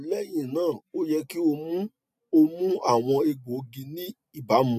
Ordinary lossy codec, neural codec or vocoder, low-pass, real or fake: none; none; 14.4 kHz; real